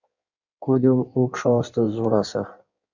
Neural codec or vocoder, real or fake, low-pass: codec, 16 kHz in and 24 kHz out, 1.1 kbps, FireRedTTS-2 codec; fake; 7.2 kHz